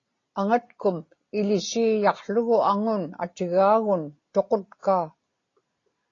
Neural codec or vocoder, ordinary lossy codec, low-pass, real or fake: none; AAC, 32 kbps; 7.2 kHz; real